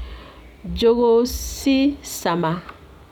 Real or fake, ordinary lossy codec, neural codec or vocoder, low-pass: real; none; none; 19.8 kHz